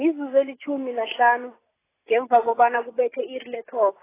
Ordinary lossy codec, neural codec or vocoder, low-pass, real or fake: AAC, 16 kbps; none; 3.6 kHz; real